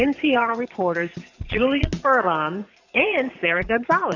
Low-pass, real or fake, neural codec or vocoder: 7.2 kHz; fake; vocoder, 22.05 kHz, 80 mel bands, Vocos